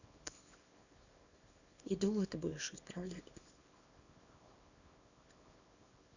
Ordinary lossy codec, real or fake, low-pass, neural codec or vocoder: none; fake; 7.2 kHz; codec, 24 kHz, 0.9 kbps, WavTokenizer, small release